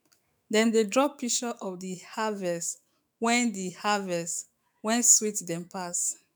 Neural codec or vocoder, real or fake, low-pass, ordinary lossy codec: autoencoder, 48 kHz, 128 numbers a frame, DAC-VAE, trained on Japanese speech; fake; none; none